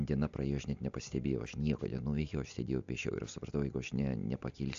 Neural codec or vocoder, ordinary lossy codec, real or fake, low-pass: none; AAC, 64 kbps; real; 7.2 kHz